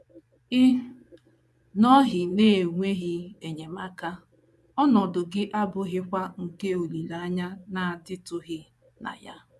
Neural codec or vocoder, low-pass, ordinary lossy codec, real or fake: vocoder, 24 kHz, 100 mel bands, Vocos; none; none; fake